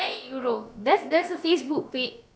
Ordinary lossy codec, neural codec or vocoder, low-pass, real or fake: none; codec, 16 kHz, about 1 kbps, DyCAST, with the encoder's durations; none; fake